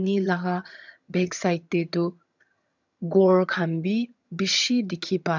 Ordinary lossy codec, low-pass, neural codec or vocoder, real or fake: none; 7.2 kHz; vocoder, 22.05 kHz, 80 mel bands, HiFi-GAN; fake